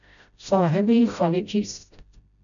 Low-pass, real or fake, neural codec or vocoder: 7.2 kHz; fake; codec, 16 kHz, 0.5 kbps, FreqCodec, smaller model